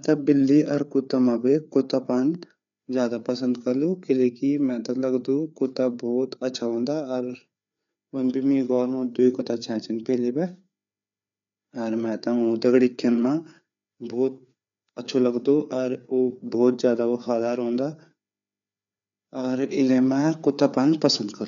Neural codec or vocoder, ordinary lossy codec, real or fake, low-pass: codec, 16 kHz, 4 kbps, FreqCodec, larger model; none; fake; 7.2 kHz